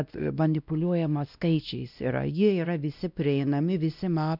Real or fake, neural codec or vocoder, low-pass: fake; codec, 16 kHz, 1 kbps, X-Codec, WavLM features, trained on Multilingual LibriSpeech; 5.4 kHz